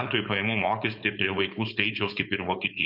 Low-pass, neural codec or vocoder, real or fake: 5.4 kHz; codec, 16 kHz, 4.8 kbps, FACodec; fake